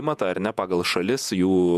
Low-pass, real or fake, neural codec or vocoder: 14.4 kHz; fake; vocoder, 48 kHz, 128 mel bands, Vocos